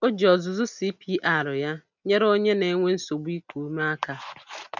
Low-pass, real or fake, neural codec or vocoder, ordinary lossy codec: 7.2 kHz; real; none; none